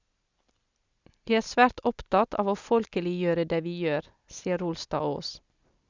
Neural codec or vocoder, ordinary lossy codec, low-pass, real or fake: none; Opus, 64 kbps; 7.2 kHz; real